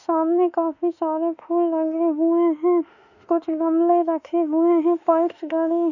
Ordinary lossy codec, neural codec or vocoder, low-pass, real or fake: none; autoencoder, 48 kHz, 32 numbers a frame, DAC-VAE, trained on Japanese speech; 7.2 kHz; fake